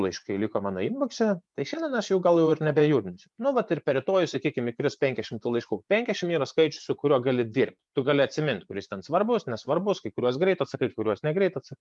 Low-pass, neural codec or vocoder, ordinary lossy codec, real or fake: 10.8 kHz; vocoder, 24 kHz, 100 mel bands, Vocos; MP3, 96 kbps; fake